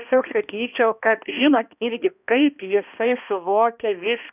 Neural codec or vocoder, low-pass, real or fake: codec, 16 kHz, 1 kbps, X-Codec, HuBERT features, trained on balanced general audio; 3.6 kHz; fake